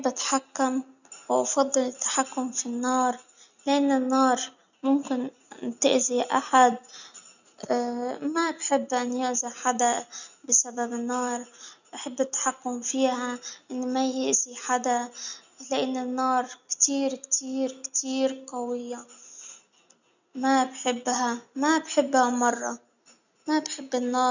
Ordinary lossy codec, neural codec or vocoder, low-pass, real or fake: none; none; 7.2 kHz; real